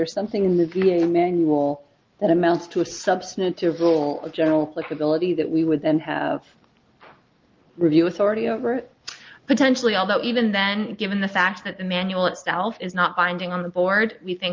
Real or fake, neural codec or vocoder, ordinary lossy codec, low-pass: real; none; Opus, 32 kbps; 7.2 kHz